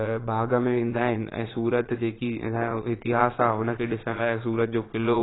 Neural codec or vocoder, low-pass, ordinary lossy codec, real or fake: vocoder, 22.05 kHz, 80 mel bands, Vocos; 7.2 kHz; AAC, 16 kbps; fake